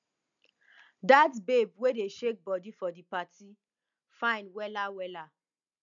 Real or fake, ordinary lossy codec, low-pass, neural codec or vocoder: real; none; 7.2 kHz; none